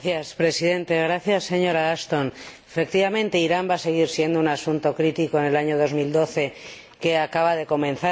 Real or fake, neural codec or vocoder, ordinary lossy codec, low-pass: real; none; none; none